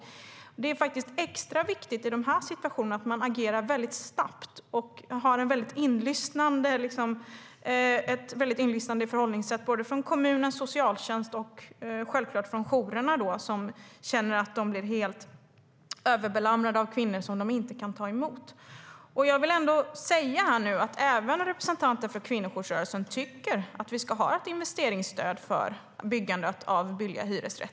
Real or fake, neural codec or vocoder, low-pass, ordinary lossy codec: real; none; none; none